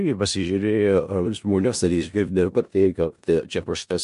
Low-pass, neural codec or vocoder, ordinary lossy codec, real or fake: 10.8 kHz; codec, 16 kHz in and 24 kHz out, 0.4 kbps, LongCat-Audio-Codec, four codebook decoder; MP3, 64 kbps; fake